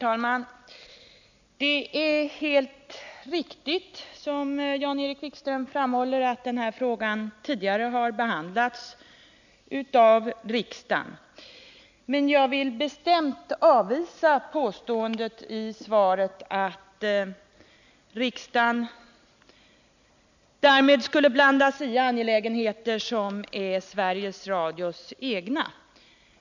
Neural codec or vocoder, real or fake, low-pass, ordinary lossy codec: none; real; 7.2 kHz; none